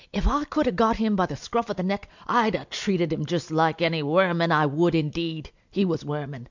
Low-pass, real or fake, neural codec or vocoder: 7.2 kHz; real; none